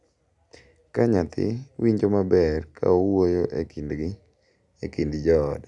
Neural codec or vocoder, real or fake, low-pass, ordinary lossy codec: none; real; 10.8 kHz; none